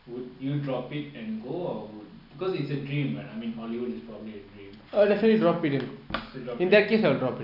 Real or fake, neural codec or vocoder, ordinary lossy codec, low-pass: real; none; none; 5.4 kHz